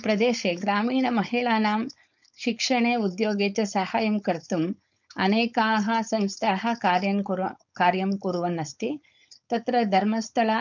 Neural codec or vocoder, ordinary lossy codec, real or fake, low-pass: codec, 16 kHz, 4.8 kbps, FACodec; none; fake; 7.2 kHz